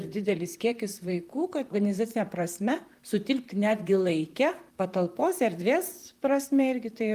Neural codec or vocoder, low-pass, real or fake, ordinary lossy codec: vocoder, 44.1 kHz, 128 mel bands, Pupu-Vocoder; 14.4 kHz; fake; Opus, 32 kbps